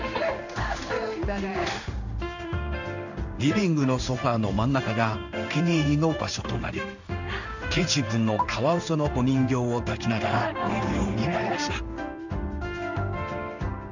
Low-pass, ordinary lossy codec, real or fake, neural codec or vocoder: 7.2 kHz; none; fake; codec, 16 kHz in and 24 kHz out, 1 kbps, XY-Tokenizer